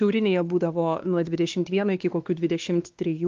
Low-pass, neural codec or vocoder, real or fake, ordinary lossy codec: 7.2 kHz; codec, 16 kHz, 2 kbps, X-Codec, WavLM features, trained on Multilingual LibriSpeech; fake; Opus, 24 kbps